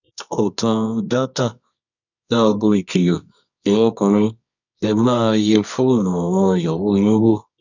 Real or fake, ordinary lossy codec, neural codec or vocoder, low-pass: fake; none; codec, 24 kHz, 0.9 kbps, WavTokenizer, medium music audio release; 7.2 kHz